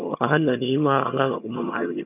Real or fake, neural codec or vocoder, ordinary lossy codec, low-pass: fake; vocoder, 22.05 kHz, 80 mel bands, HiFi-GAN; none; 3.6 kHz